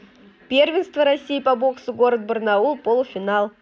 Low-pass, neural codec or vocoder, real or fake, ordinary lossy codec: none; none; real; none